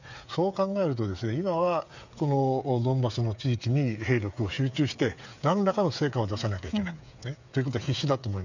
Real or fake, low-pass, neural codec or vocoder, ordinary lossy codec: fake; 7.2 kHz; codec, 16 kHz, 8 kbps, FreqCodec, smaller model; none